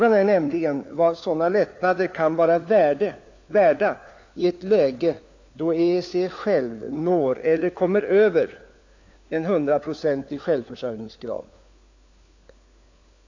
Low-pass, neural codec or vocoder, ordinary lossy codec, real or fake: 7.2 kHz; codec, 16 kHz, 4 kbps, FunCodec, trained on LibriTTS, 50 frames a second; AAC, 48 kbps; fake